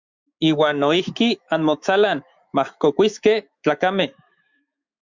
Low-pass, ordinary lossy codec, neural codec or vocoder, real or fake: 7.2 kHz; Opus, 64 kbps; autoencoder, 48 kHz, 128 numbers a frame, DAC-VAE, trained on Japanese speech; fake